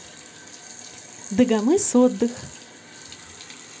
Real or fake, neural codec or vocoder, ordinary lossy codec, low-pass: real; none; none; none